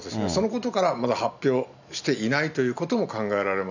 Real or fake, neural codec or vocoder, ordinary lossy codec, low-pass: real; none; none; 7.2 kHz